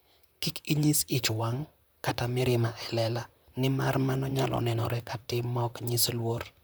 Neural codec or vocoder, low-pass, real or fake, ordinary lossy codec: vocoder, 44.1 kHz, 128 mel bands, Pupu-Vocoder; none; fake; none